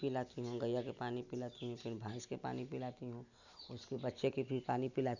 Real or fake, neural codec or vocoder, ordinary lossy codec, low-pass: real; none; none; 7.2 kHz